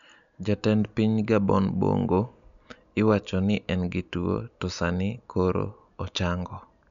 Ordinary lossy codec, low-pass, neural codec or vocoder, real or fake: none; 7.2 kHz; none; real